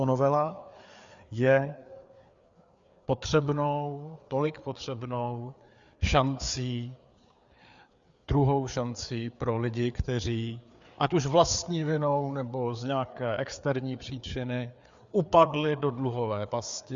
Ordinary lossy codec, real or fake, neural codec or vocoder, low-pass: Opus, 64 kbps; fake; codec, 16 kHz, 4 kbps, FreqCodec, larger model; 7.2 kHz